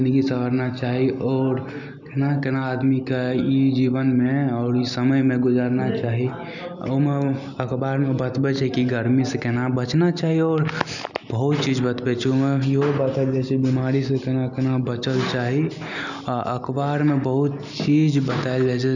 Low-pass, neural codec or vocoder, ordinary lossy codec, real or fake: 7.2 kHz; none; none; real